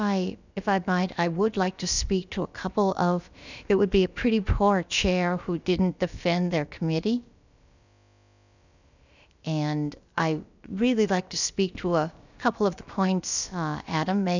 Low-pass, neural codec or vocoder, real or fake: 7.2 kHz; codec, 16 kHz, about 1 kbps, DyCAST, with the encoder's durations; fake